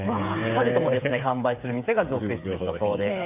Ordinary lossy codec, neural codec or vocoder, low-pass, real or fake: none; codec, 16 kHz, 8 kbps, FreqCodec, smaller model; 3.6 kHz; fake